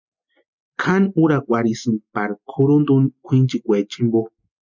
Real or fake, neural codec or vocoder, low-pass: real; none; 7.2 kHz